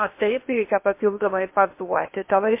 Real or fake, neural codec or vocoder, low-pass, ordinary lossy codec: fake; codec, 16 kHz in and 24 kHz out, 0.8 kbps, FocalCodec, streaming, 65536 codes; 3.6 kHz; MP3, 24 kbps